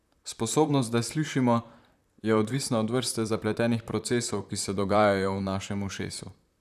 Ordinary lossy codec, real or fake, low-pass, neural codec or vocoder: none; fake; 14.4 kHz; vocoder, 44.1 kHz, 128 mel bands, Pupu-Vocoder